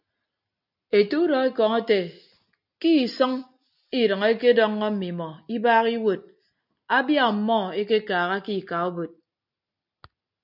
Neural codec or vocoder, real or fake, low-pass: none; real; 5.4 kHz